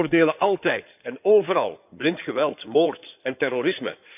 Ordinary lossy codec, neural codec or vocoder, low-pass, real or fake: none; codec, 16 kHz in and 24 kHz out, 2.2 kbps, FireRedTTS-2 codec; 3.6 kHz; fake